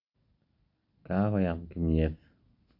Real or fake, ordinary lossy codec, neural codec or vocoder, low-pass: real; none; none; 5.4 kHz